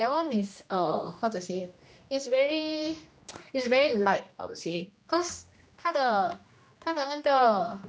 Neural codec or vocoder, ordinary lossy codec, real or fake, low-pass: codec, 16 kHz, 1 kbps, X-Codec, HuBERT features, trained on general audio; none; fake; none